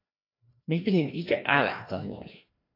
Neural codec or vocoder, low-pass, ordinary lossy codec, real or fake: codec, 16 kHz, 1 kbps, FreqCodec, larger model; 5.4 kHz; AAC, 32 kbps; fake